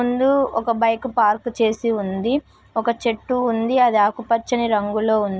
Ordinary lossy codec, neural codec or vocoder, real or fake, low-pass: none; none; real; none